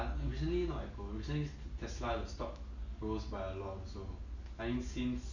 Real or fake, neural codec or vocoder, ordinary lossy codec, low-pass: real; none; none; 7.2 kHz